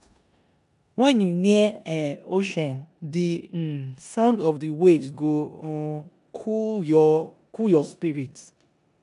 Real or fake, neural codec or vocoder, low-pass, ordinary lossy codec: fake; codec, 16 kHz in and 24 kHz out, 0.9 kbps, LongCat-Audio-Codec, four codebook decoder; 10.8 kHz; none